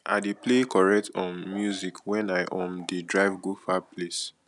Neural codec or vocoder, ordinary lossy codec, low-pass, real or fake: none; none; 10.8 kHz; real